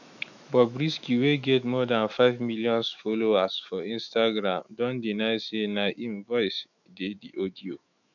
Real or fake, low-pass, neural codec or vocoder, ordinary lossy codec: fake; 7.2 kHz; autoencoder, 48 kHz, 128 numbers a frame, DAC-VAE, trained on Japanese speech; none